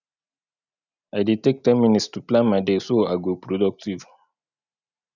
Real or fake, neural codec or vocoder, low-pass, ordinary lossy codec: real; none; 7.2 kHz; none